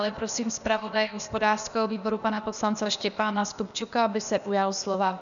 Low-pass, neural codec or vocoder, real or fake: 7.2 kHz; codec, 16 kHz, 0.8 kbps, ZipCodec; fake